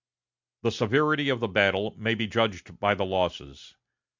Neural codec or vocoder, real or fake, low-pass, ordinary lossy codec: none; real; 7.2 kHz; MP3, 64 kbps